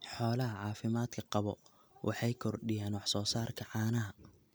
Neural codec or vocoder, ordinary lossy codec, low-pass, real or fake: none; none; none; real